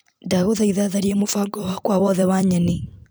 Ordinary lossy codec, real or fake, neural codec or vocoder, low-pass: none; fake; vocoder, 44.1 kHz, 128 mel bands every 256 samples, BigVGAN v2; none